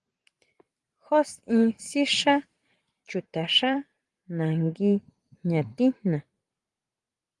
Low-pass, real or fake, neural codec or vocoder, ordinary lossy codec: 10.8 kHz; fake; vocoder, 44.1 kHz, 128 mel bands every 512 samples, BigVGAN v2; Opus, 24 kbps